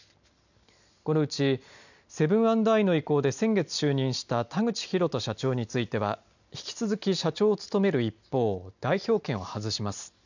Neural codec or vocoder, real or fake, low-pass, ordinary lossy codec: none; real; 7.2 kHz; none